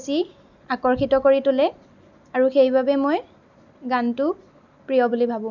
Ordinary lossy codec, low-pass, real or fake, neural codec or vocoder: none; 7.2 kHz; real; none